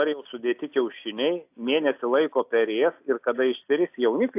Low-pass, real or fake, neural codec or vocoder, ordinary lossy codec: 3.6 kHz; real; none; AAC, 32 kbps